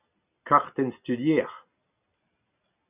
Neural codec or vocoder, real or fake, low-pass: none; real; 3.6 kHz